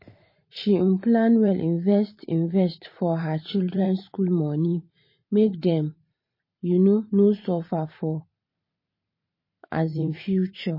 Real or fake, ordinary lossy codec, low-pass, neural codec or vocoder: fake; MP3, 24 kbps; 5.4 kHz; vocoder, 44.1 kHz, 128 mel bands every 512 samples, BigVGAN v2